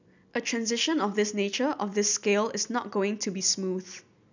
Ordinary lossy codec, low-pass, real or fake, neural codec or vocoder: none; 7.2 kHz; real; none